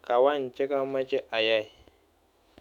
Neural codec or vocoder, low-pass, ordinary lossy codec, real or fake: autoencoder, 48 kHz, 128 numbers a frame, DAC-VAE, trained on Japanese speech; 19.8 kHz; none; fake